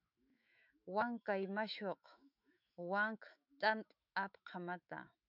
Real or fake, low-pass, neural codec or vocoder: fake; 5.4 kHz; autoencoder, 48 kHz, 128 numbers a frame, DAC-VAE, trained on Japanese speech